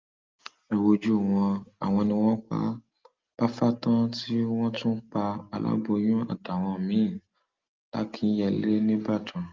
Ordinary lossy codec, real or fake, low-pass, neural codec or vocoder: Opus, 32 kbps; real; 7.2 kHz; none